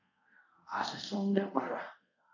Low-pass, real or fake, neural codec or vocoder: 7.2 kHz; fake; codec, 24 kHz, 0.5 kbps, DualCodec